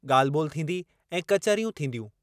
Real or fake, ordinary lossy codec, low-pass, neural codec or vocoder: real; none; 14.4 kHz; none